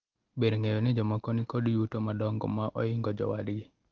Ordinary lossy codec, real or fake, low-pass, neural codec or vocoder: Opus, 16 kbps; real; 7.2 kHz; none